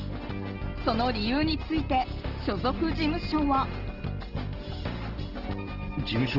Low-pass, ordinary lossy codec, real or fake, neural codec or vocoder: 5.4 kHz; Opus, 16 kbps; real; none